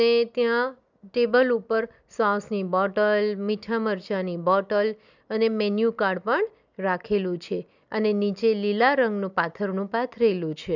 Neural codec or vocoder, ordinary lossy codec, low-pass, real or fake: none; none; 7.2 kHz; real